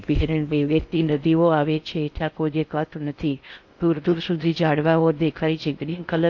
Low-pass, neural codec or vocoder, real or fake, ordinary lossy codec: 7.2 kHz; codec, 16 kHz in and 24 kHz out, 0.6 kbps, FocalCodec, streaming, 4096 codes; fake; AAC, 48 kbps